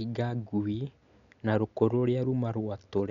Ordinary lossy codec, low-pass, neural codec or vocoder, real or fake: none; 7.2 kHz; none; real